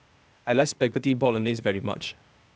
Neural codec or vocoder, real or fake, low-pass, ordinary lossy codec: codec, 16 kHz, 0.8 kbps, ZipCodec; fake; none; none